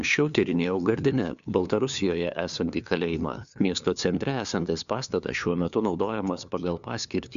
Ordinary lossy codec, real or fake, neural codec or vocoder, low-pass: MP3, 96 kbps; fake; codec, 16 kHz, 2 kbps, FunCodec, trained on LibriTTS, 25 frames a second; 7.2 kHz